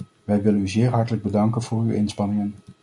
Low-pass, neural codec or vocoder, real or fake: 10.8 kHz; none; real